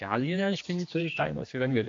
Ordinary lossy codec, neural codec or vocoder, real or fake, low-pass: AAC, 48 kbps; codec, 16 kHz, 2 kbps, X-Codec, HuBERT features, trained on general audio; fake; 7.2 kHz